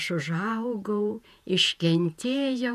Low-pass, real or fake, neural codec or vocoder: 14.4 kHz; fake; vocoder, 48 kHz, 128 mel bands, Vocos